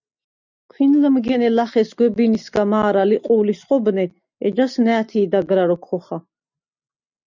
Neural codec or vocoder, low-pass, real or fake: none; 7.2 kHz; real